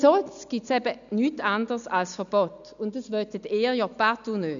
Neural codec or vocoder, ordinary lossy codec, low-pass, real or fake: none; none; 7.2 kHz; real